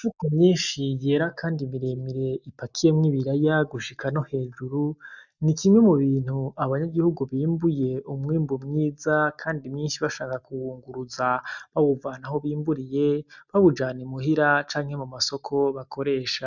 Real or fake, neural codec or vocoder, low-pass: real; none; 7.2 kHz